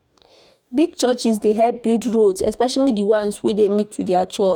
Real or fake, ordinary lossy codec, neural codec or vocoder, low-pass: fake; none; codec, 44.1 kHz, 2.6 kbps, DAC; 19.8 kHz